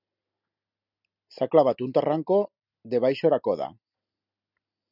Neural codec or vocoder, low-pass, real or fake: none; 5.4 kHz; real